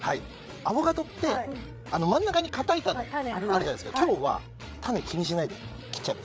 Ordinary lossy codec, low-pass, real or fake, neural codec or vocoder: none; none; fake; codec, 16 kHz, 8 kbps, FreqCodec, larger model